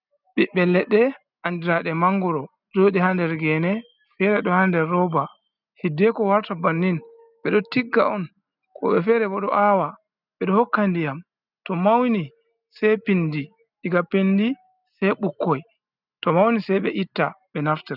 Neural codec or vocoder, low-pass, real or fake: none; 5.4 kHz; real